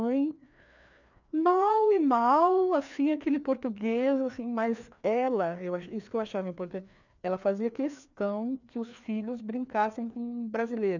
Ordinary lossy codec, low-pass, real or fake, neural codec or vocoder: none; 7.2 kHz; fake; codec, 16 kHz, 2 kbps, FreqCodec, larger model